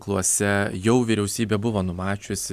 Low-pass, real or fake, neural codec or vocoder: 14.4 kHz; real; none